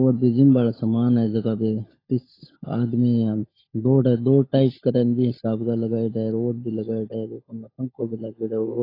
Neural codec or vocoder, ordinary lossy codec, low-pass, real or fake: codec, 16 kHz, 16 kbps, FunCodec, trained on LibriTTS, 50 frames a second; AAC, 24 kbps; 5.4 kHz; fake